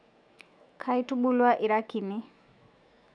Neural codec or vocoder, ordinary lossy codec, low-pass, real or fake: autoencoder, 48 kHz, 128 numbers a frame, DAC-VAE, trained on Japanese speech; none; 9.9 kHz; fake